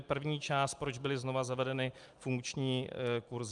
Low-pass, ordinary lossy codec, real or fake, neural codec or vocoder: 10.8 kHz; Opus, 32 kbps; real; none